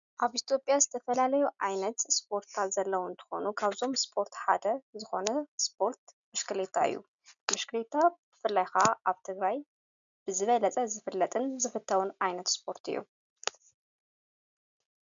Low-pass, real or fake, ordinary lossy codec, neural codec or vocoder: 7.2 kHz; real; MP3, 96 kbps; none